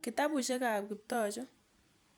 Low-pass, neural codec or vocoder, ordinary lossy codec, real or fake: none; vocoder, 44.1 kHz, 128 mel bands every 256 samples, BigVGAN v2; none; fake